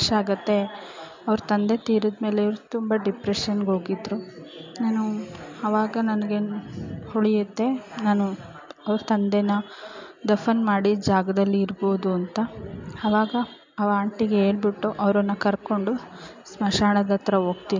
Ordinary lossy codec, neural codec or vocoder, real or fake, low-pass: MP3, 64 kbps; none; real; 7.2 kHz